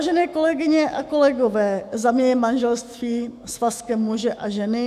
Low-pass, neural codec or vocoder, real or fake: 14.4 kHz; autoencoder, 48 kHz, 128 numbers a frame, DAC-VAE, trained on Japanese speech; fake